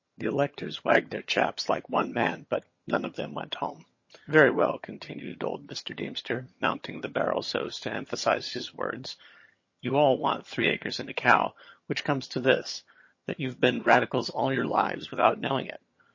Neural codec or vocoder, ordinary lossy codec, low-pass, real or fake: vocoder, 22.05 kHz, 80 mel bands, HiFi-GAN; MP3, 32 kbps; 7.2 kHz; fake